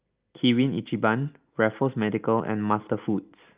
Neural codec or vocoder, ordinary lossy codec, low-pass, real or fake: none; Opus, 24 kbps; 3.6 kHz; real